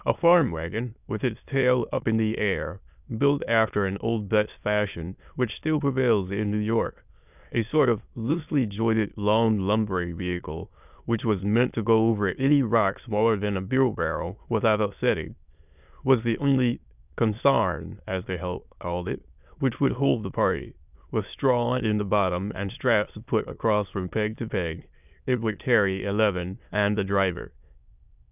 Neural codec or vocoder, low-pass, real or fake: autoencoder, 22.05 kHz, a latent of 192 numbers a frame, VITS, trained on many speakers; 3.6 kHz; fake